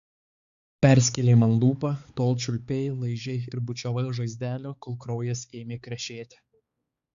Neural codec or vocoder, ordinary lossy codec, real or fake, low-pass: codec, 16 kHz, 4 kbps, X-Codec, HuBERT features, trained on balanced general audio; Opus, 64 kbps; fake; 7.2 kHz